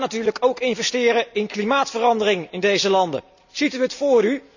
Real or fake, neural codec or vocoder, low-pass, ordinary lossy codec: real; none; 7.2 kHz; none